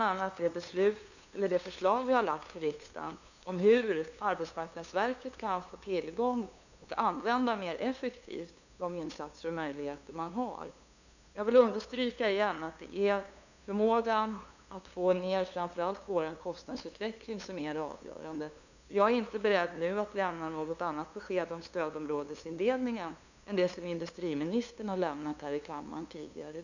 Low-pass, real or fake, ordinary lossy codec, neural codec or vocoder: 7.2 kHz; fake; none; codec, 16 kHz, 2 kbps, FunCodec, trained on LibriTTS, 25 frames a second